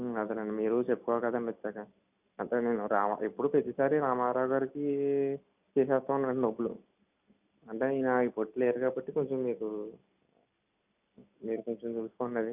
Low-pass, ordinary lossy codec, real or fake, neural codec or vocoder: 3.6 kHz; none; real; none